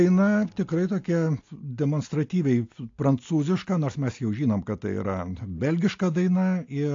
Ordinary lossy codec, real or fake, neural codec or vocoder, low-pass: AAC, 48 kbps; real; none; 7.2 kHz